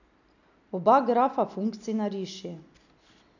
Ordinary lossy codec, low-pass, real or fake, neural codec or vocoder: none; 7.2 kHz; real; none